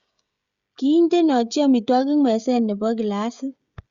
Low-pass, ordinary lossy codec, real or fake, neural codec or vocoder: 7.2 kHz; Opus, 64 kbps; fake; codec, 16 kHz, 16 kbps, FreqCodec, smaller model